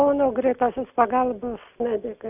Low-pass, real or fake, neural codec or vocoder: 3.6 kHz; real; none